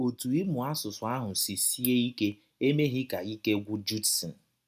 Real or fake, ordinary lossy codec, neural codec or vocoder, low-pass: real; none; none; 14.4 kHz